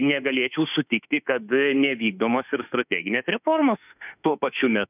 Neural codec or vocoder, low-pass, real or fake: autoencoder, 48 kHz, 32 numbers a frame, DAC-VAE, trained on Japanese speech; 3.6 kHz; fake